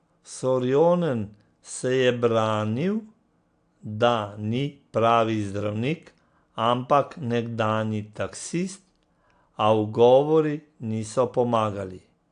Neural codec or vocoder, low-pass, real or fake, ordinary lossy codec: none; 9.9 kHz; real; AAC, 64 kbps